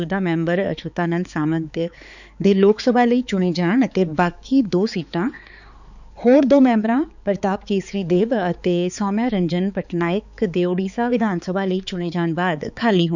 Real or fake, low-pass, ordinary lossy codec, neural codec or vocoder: fake; 7.2 kHz; none; codec, 16 kHz, 4 kbps, X-Codec, HuBERT features, trained on balanced general audio